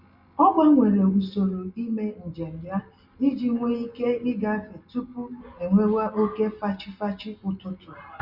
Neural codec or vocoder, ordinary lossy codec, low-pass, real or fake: none; Opus, 64 kbps; 5.4 kHz; real